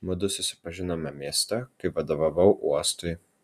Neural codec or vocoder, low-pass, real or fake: none; 14.4 kHz; real